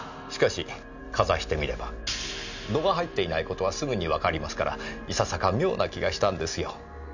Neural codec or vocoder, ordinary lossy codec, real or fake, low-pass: none; none; real; 7.2 kHz